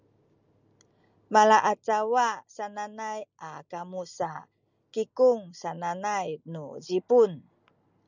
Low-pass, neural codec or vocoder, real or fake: 7.2 kHz; none; real